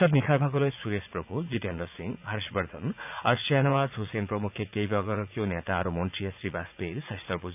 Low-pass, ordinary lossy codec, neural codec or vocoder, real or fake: 3.6 kHz; none; vocoder, 44.1 kHz, 80 mel bands, Vocos; fake